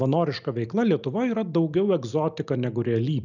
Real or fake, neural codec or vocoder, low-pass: real; none; 7.2 kHz